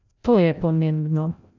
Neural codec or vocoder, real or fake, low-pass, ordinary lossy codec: codec, 16 kHz, 0.5 kbps, FreqCodec, larger model; fake; 7.2 kHz; AAC, 48 kbps